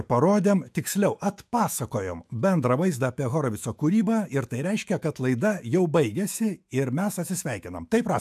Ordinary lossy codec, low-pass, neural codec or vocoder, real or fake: AAC, 96 kbps; 14.4 kHz; autoencoder, 48 kHz, 128 numbers a frame, DAC-VAE, trained on Japanese speech; fake